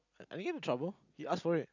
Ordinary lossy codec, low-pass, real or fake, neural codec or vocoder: none; 7.2 kHz; real; none